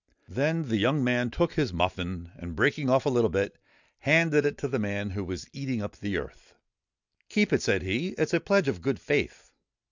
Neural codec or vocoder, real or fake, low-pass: none; real; 7.2 kHz